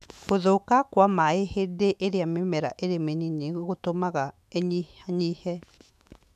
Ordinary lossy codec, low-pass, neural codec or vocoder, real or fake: none; 14.4 kHz; autoencoder, 48 kHz, 128 numbers a frame, DAC-VAE, trained on Japanese speech; fake